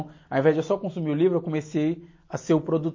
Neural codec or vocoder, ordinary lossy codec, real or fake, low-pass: none; MP3, 32 kbps; real; 7.2 kHz